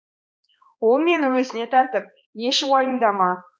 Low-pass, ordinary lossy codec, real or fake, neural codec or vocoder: none; none; fake; codec, 16 kHz, 2 kbps, X-Codec, HuBERT features, trained on balanced general audio